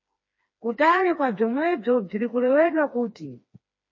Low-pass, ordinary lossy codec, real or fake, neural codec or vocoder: 7.2 kHz; MP3, 32 kbps; fake; codec, 16 kHz, 2 kbps, FreqCodec, smaller model